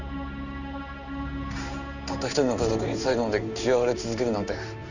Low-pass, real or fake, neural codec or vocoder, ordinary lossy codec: 7.2 kHz; fake; codec, 16 kHz in and 24 kHz out, 1 kbps, XY-Tokenizer; none